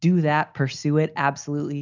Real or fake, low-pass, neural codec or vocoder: real; 7.2 kHz; none